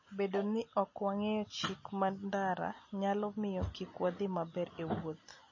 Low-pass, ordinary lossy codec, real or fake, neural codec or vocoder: 7.2 kHz; MP3, 32 kbps; real; none